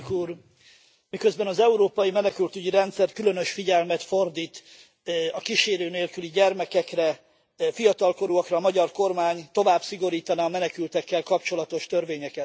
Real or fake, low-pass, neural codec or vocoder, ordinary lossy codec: real; none; none; none